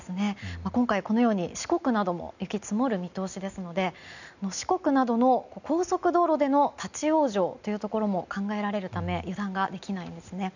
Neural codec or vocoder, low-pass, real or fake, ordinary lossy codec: none; 7.2 kHz; real; none